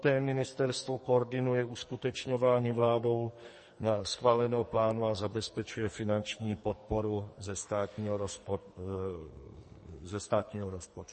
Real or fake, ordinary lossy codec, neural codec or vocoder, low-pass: fake; MP3, 32 kbps; codec, 44.1 kHz, 2.6 kbps, SNAC; 10.8 kHz